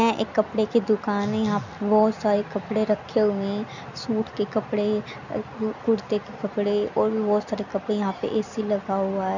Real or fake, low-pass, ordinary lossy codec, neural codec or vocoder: real; 7.2 kHz; none; none